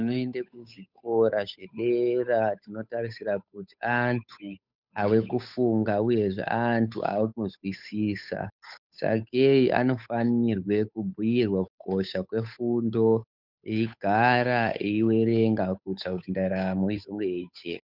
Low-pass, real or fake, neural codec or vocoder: 5.4 kHz; fake; codec, 16 kHz, 8 kbps, FunCodec, trained on Chinese and English, 25 frames a second